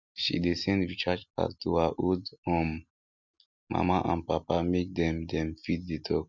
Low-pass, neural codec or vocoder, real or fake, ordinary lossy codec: 7.2 kHz; none; real; none